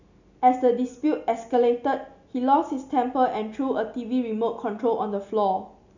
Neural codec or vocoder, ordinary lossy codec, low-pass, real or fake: none; none; 7.2 kHz; real